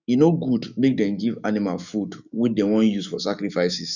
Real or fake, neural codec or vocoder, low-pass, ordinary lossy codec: fake; autoencoder, 48 kHz, 128 numbers a frame, DAC-VAE, trained on Japanese speech; 7.2 kHz; none